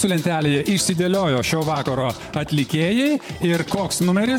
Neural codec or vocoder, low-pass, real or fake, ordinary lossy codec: vocoder, 44.1 kHz, 128 mel bands, Pupu-Vocoder; 19.8 kHz; fake; MP3, 96 kbps